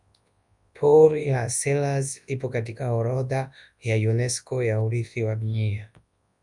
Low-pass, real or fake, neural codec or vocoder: 10.8 kHz; fake; codec, 24 kHz, 0.9 kbps, WavTokenizer, large speech release